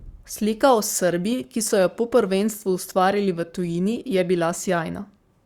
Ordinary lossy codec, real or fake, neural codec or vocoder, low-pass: Opus, 64 kbps; fake; codec, 44.1 kHz, 7.8 kbps, Pupu-Codec; 19.8 kHz